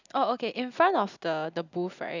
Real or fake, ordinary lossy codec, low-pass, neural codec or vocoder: real; none; 7.2 kHz; none